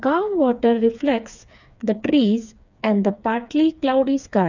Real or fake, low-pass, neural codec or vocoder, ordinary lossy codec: fake; 7.2 kHz; codec, 16 kHz, 4 kbps, FreqCodec, smaller model; none